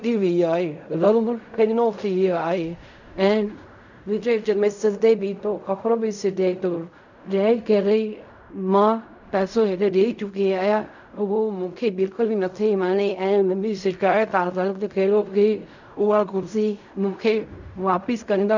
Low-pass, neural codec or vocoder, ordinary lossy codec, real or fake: 7.2 kHz; codec, 16 kHz in and 24 kHz out, 0.4 kbps, LongCat-Audio-Codec, fine tuned four codebook decoder; none; fake